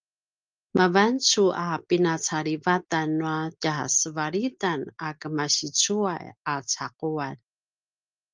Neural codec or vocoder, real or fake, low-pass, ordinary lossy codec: none; real; 7.2 kHz; Opus, 32 kbps